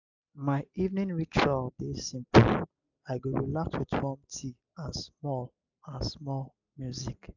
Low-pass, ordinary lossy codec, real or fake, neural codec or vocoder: 7.2 kHz; none; real; none